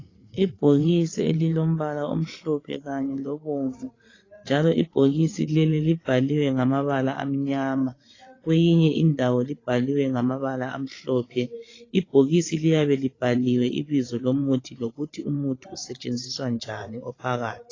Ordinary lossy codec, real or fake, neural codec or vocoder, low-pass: AAC, 32 kbps; fake; codec, 16 kHz, 8 kbps, FreqCodec, larger model; 7.2 kHz